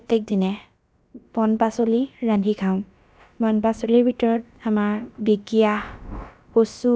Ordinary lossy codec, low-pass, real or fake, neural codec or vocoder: none; none; fake; codec, 16 kHz, about 1 kbps, DyCAST, with the encoder's durations